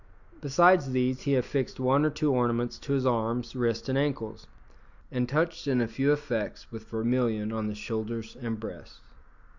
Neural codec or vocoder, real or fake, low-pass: none; real; 7.2 kHz